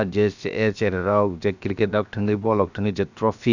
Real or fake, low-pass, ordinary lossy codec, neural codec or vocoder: fake; 7.2 kHz; none; codec, 16 kHz, about 1 kbps, DyCAST, with the encoder's durations